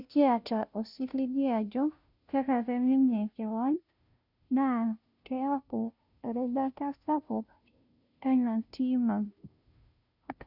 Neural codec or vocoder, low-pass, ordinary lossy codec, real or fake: codec, 16 kHz, 0.5 kbps, FunCodec, trained on Chinese and English, 25 frames a second; 5.4 kHz; none; fake